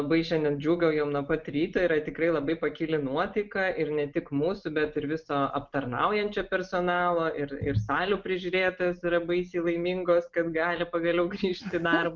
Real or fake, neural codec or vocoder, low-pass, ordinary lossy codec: real; none; 7.2 kHz; Opus, 32 kbps